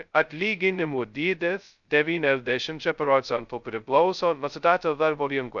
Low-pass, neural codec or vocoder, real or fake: 7.2 kHz; codec, 16 kHz, 0.2 kbps, FocalCodec; fake